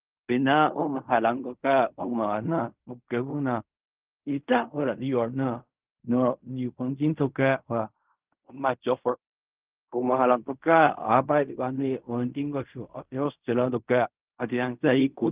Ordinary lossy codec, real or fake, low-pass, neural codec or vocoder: Opus, 24 kbps; fake; 3.6 kHz; codec, 16 kHz in and 24 kHz out, 0.4 kbps, LongCat-Audio-Codec, fine tuned four codebook decoder